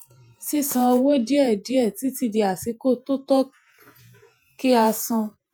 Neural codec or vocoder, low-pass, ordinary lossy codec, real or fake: vocoder, 48 kHz, 128 mel bands, Vocos; none; none; fake